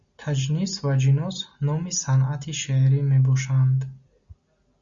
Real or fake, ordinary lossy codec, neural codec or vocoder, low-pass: real; Opus, 64 kbps; none; 7.2 kHz